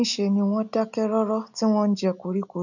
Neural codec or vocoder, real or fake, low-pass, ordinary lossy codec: none; real; 7.2 kHz; none